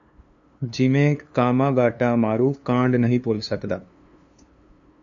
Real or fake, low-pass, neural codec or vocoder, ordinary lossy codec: fake; 7.2 kHz; codec, 16 kHz, 2 kbps, FunCodec, trained on LibriTTS, 25 frames a second; AAC, 64 kbps